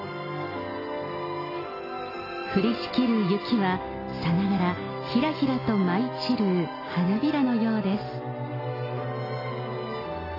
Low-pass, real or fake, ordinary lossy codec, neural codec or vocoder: 5.4 kHz; real; AAC, 24 kbps; none